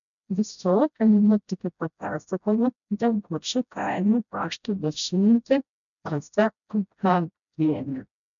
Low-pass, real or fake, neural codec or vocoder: 7.2 kHz; fake; codec, 16 kHz, 0.5 kbps, FreqCodec, smaller model